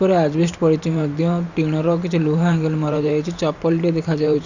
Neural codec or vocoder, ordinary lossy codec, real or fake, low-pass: vocoder, 44.1 kHz, 128 mel bands every 512 samples, BigVGAN v2; none; fake; 7.2 kHz